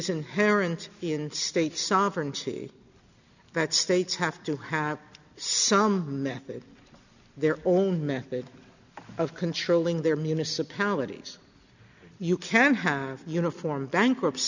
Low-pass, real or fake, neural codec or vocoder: 7.2 kHz; real; none